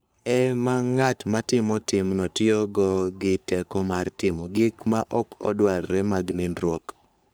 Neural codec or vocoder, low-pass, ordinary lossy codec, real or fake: codec, 44.1 kHz, 3.4 kbps, Pupu-Codec; none; none; fake